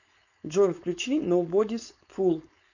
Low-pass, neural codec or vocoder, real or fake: 7.2 kHz; codec, 16 kHz, 4.8 kbps, FACodec; fake